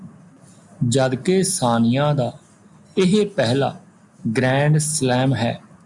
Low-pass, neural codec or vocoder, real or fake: 10.8 kHz; none; real